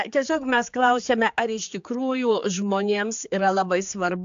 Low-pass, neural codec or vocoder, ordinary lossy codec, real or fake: 7.2 kHz; codec, 16 kHz, 4 kbps, X-Codec, HuBERT features, trained on general audio; AAC, 64 kbps; fake